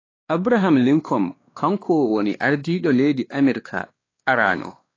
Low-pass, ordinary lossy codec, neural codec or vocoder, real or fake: 7.2 kHz; AAC, 32 kbps; codec, 16 kHz, 2 kbps, X-Codec, WavLM features, trained on Multilingual LibriSpeech; fake